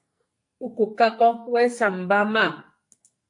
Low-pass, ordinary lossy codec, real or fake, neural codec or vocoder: 10.8 kHz; AAC, 64 kbps; fake; codec, 44.1 kHz, 2.6 kbps, SNAC